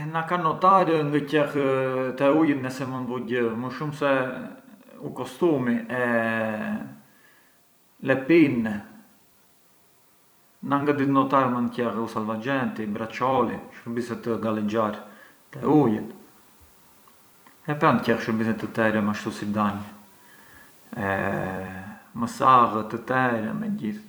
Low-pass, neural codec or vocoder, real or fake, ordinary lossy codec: none; vocoder, 44.1 kHz, 128 mel bands every 256 samples, BigVGAN v2; fake; none